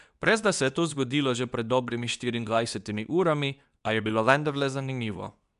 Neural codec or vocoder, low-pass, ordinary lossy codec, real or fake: codec, 24 kHz, 0.9 kbps, WavTokenizer, medium speech release version 2; 10.8 kHz; none; fake